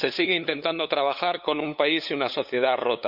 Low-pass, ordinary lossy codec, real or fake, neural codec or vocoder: 5.4 kHz; none; fake; codec, 16 kHz, 8 kbps, FunCodec, trained on LibriTTS, 25 frames a second